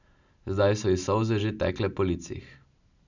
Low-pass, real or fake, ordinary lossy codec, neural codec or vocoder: 7.2 kHz; real; none; none